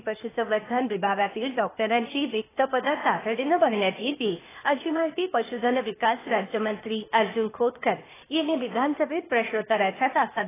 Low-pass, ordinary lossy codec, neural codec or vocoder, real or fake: 3.6 kHz; AAC, 16 kbps; codec, 16 kHz, 0.8 kbps, ZipCodec; fake